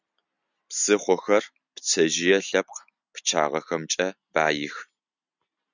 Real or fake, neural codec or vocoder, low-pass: real; none; 7.2 kHz